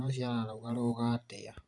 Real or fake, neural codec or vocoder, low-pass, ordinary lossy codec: fake; vocoder, 44.1 kHz, 128 mel bands every 512 samples, BigVGAN v2; 10.8 kHz; none